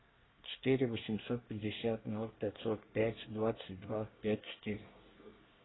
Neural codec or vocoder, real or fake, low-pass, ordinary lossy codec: codec, 24 kHz, 1 kbps, SNAC; fake; 7.2 kHz; AAC, 16 kbps